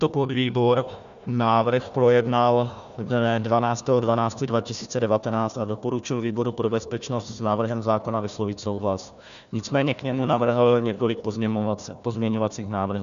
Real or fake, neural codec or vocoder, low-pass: fake; codec, 16 kHz, 1 kbps, FunCodec, trained on Chinese and English, 50 frames a second; 7.2 kHz